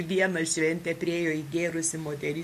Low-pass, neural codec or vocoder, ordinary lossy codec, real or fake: 14.4 kHz; none; MP3, 64 kbps; real